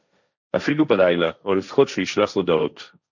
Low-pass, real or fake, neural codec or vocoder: 7.2 kHz; fake; codec, 16 kHz, 1.1 kbps, Voila-Tokenizer